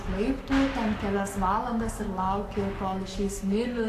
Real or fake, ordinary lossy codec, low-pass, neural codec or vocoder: fake; AAC, 96 kbps; 14.4 kHz; codec, 44.1 kHz, 7.8 kbps, Pupu-Codec